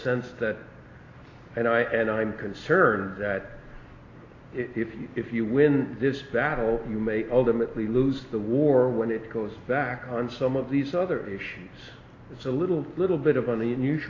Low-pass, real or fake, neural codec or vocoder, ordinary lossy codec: 7.2 kHz; real; none; AAC, 32 kbps